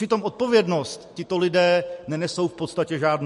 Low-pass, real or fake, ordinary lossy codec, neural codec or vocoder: 14.4 kHz; real; MP3, 48 kbps; none